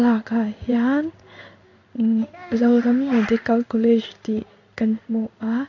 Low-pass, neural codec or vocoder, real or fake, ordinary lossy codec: 7.2 kHz; codec, 16 kHz in and 24 kHz out, 1 kbps, XY-Tokenizer; fake; AAC, 48 kbps